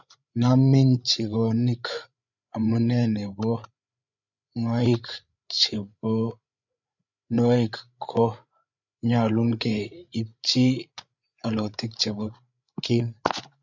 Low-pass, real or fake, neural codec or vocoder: 7.2 kHz; fake; codec, 16 kHz, 8 kbps, FreqCodec, larger model